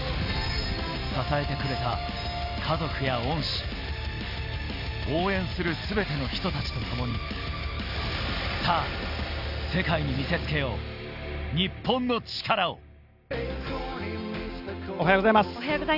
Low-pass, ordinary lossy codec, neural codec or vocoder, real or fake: 5.4 kHz; none; none; real